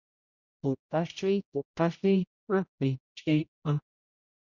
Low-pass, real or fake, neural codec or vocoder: 7.2 kHz; fake; codec, 16 kHz, 0.5 kbps, X-Codec, HuBERT features, trained on general audio